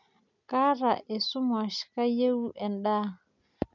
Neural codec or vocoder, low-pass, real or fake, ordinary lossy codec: none; 7.2 kHz; real; none